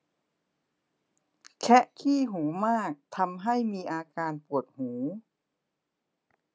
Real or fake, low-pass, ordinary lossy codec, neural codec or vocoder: real; none; none; none